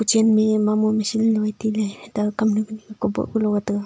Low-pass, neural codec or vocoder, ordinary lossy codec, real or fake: none; none; none; real